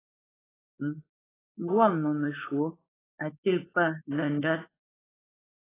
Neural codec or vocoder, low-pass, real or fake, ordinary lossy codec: codec, 16 kHz, 4.8 kbps, FACodec; 3.6 kHz; fake; AAC, 16 kbps